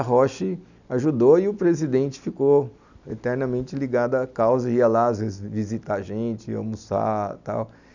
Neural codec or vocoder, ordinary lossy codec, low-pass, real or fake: none; none; 7.2 kHz; real